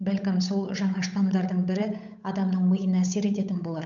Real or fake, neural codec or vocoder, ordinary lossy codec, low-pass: fake; codec, 16 kHz, 8 kbps, FunCodec, trained on Chinese and English, 25 frames a second; none; 7.2 kHz